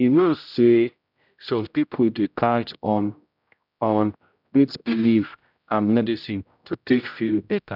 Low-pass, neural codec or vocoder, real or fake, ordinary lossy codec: 5.4 kHz; codec, 16 kHz, 0.5 kbps, X-Codec, HuBERT features, trained on general audio; fake; none